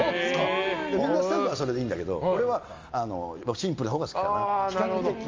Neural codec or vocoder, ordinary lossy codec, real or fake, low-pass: none; Opus, 32 kbps; real; 7.2 kHz